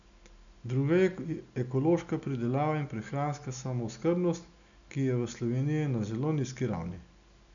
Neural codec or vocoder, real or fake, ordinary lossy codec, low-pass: none; real; none; 7.2 kHz